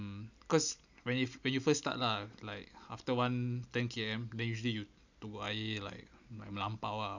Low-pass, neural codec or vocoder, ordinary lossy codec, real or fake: 7.2 kHz; none; none; real